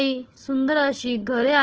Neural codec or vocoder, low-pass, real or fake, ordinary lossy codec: codec, 16 kHz, 4 kbps, FunCodec, trained on Chinese and English, 50 frames a second; 7.2 kHz; fake; Opus, 16 kbps